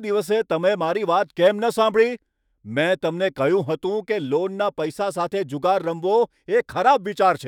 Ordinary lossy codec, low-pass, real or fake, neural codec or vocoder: none; 19.8 kHz; fake; autoencoder, 48 kHz, 128 numbers a frame, DAC-VAE, trained on Japanese speech